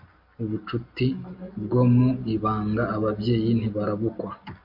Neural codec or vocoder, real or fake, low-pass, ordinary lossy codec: none; real; 5.4 kHz; MP3, 32 kbps